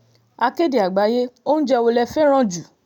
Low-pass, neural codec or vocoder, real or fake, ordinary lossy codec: 19.8 kHz; none; real; none